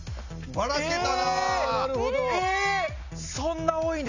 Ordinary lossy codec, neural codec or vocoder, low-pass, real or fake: none; none; 7.2 kHz; real